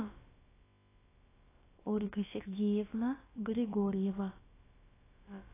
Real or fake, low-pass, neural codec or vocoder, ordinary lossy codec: fake; 3.6 kHz; codec, 16 kHz, about 1 kbps, DyCAST, with the encoder's durations; AAC, 16 kbps